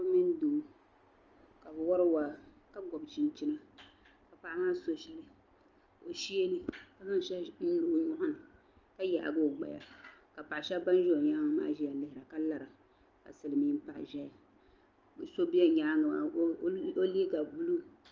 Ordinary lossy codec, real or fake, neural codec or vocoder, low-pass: Opus, 24 kbps; real; none; 7.2 kHz